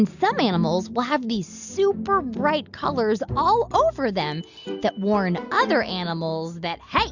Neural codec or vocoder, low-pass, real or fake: none; 7.2 kHz; real